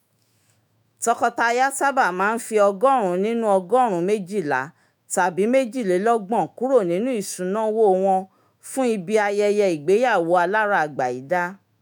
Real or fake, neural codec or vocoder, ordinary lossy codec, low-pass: fake; autoencoder, 48 kHz, 128 numbers a frame, DAC-VAE, trained on Japanese speech; none; none